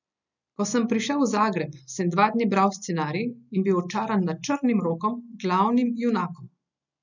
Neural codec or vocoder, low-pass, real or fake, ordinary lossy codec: none; 7.2 kHz; real; none